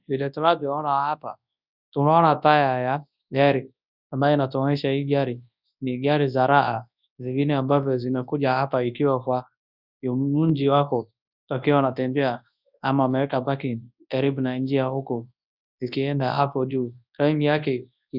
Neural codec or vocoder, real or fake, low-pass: codec, 24 kHz, 0.9 kbps, WavTokenizer, large speech release; fake; 5.4 kHz